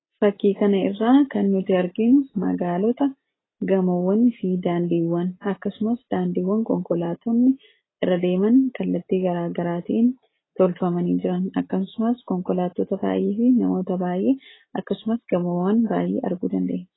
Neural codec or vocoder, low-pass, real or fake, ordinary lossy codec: none; 7.2 kHz; real; AAC, 16 kbps